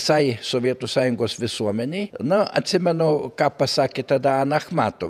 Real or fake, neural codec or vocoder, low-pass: fake; vocoder, 48 kHz, 128 mel bands, Vocos; 14.4 kHz